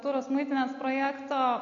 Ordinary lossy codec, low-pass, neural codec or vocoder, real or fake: MP3, 48 kbps; 7.2 kHz; none; real